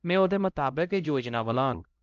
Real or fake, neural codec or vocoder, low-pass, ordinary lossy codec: fake; codec, 16 kHz, 0.5 kbps, X-Codec, HuBERT features, trained on LibriSpeech; 7.2 kHz; Opus, 24 kbps